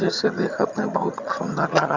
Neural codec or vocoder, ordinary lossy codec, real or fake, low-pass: vocoder, 22.05 kHz, 80 mel bands, HiFi-GAN; Opus, 64 kbps; fake; 7.2 kHz